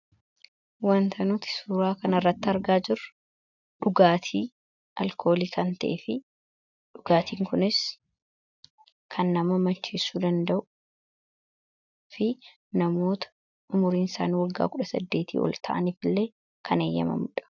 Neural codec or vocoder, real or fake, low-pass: none; real; 7.2 kHz